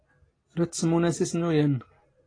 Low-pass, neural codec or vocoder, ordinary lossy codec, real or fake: 9.9 kHz; none; AAC, 32 kbps; real